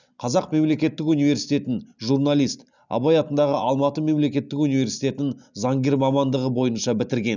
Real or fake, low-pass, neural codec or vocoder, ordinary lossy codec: real; 7.2 kHz; none; none